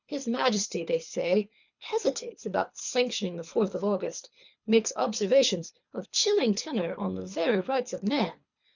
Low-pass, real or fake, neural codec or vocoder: 7.2 kHz; fake; codec, 24 kHz, 3 kbps, HILCodec